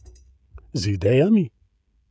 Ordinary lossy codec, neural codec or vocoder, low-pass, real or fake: none; codec, 16 kHz, 16 kbps, FreqCodec, smaller model; none; fake